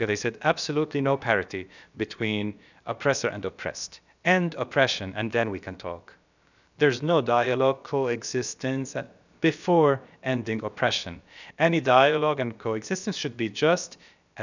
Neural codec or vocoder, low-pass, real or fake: codec, 16 kHz, about 1 kbps, DyCAST, with the encoder's durations; 7.2 kHz; fake